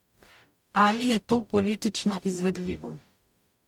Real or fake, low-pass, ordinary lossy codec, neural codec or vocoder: fake; 19.8 kHz; MP3, 96 kbps; codec, 44.1 kHz, 0.9 kbps, DAC